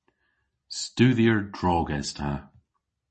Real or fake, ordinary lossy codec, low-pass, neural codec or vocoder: real; MP3, 32 kbps; 10.8 kHz; none